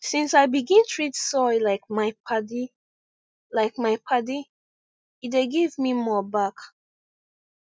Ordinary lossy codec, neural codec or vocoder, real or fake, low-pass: none; none; real; none